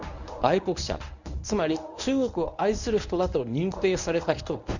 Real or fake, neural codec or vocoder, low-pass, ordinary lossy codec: fake; codec, 24 kHz, 0.9 kbps, WavTokenizer, medium speech release version 1; 7.2 kHz; none